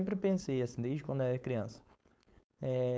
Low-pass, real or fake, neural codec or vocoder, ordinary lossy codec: none; fake; codec, 16 kHz, 4.8 kbps, FACodec; none